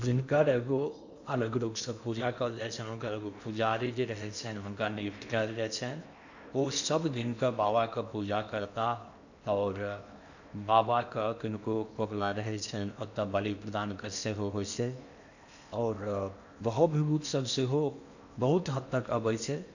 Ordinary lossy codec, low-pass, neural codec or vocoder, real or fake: none; 7.2 kHz; codec, 16 kHz in and 24 kHz out, 0.8 kbps, FocalCodec, streaming, 65536 codes; fake